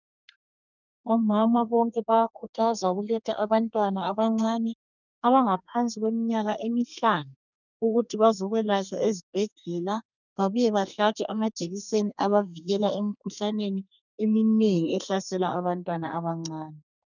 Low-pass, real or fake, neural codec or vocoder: 7.2 kHz; fake; codec, 32 kHz, 1.9 kbps, SNAC